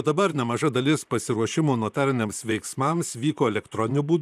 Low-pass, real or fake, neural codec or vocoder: 14.4 kHz; fake; vocoder, 44.1 kHz, 128 mel bands, Pupu-Vocoder